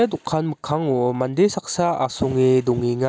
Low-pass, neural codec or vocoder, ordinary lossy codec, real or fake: none; none; none; real